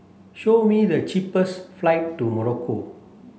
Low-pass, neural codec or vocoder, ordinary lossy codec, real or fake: none; none; none; real